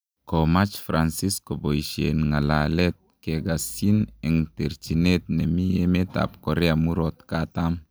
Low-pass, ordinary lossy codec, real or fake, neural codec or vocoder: none; none; real; none